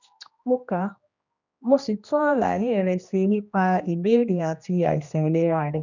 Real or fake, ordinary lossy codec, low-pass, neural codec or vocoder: fake; none; 7.2 kHz; codec, 16 kHz, 1 kbps, X-Codec, HuBERT features, trained on general audio